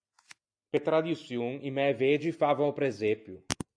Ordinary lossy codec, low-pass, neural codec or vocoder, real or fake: AAC, 64 kbps; 9.9 kHz; none; real